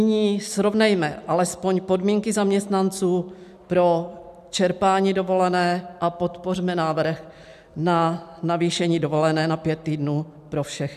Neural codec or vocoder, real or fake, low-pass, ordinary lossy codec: none; real; 14.4 kHz; AAC, 96 kbps